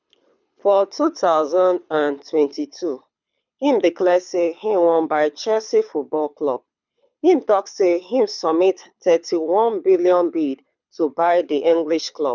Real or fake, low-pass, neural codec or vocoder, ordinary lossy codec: fake; 7.2 kHz; codec, 24 kHz, 6 kbps, HILCodec; none